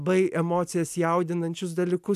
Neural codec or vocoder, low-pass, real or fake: none; 14.4 kHz; real